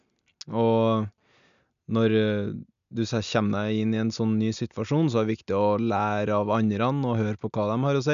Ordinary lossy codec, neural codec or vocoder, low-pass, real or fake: MP3, 96 kbps; none; 7.2 kHz; real